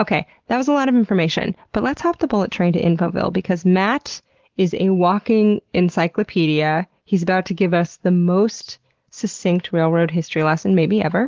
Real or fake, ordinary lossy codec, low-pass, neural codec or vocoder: real; Opus, 16 kbps; 7.2 kHz; none